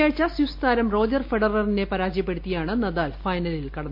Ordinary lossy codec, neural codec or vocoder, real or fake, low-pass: MP3, 32 kbps; none; real; 5.4 kHz